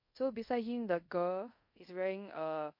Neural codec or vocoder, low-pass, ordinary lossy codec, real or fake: codec, 24 kHz, 0.5 kbps, DualCodec; 5.4 kHz; MP3, 32 kbps; fake